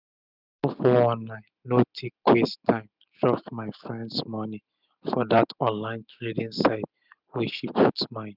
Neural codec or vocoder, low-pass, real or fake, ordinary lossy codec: none; 5.4 kHz; real; none